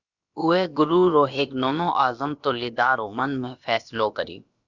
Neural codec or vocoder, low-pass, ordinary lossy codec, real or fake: codec, 16 kHz, about 1 kbps, DyCAST, with the encoder's durations; 7.2 kHz; Opus, 64 kbps; fake